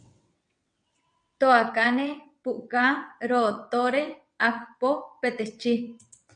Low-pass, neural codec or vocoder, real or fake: 9.9 kHz; vocoder, 22.05 kHz, 80 mel bands, WaveNeXt; fake